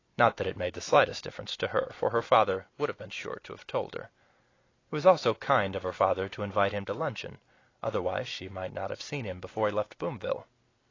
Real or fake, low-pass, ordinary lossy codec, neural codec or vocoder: real; 7.2 kHz; AAC, 32 kbps; none